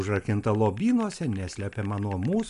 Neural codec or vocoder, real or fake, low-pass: none; real; 10.8 kHz